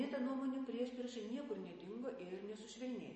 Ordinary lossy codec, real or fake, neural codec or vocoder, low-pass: MP3, 32 kbps; real; none; 10.8 kHz